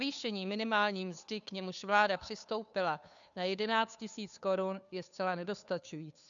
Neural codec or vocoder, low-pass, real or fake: codec, 16 kHz, 2 kbps, FunCodec, trained on Chinese and English, 25 frames a second; 7.2 kHz; fake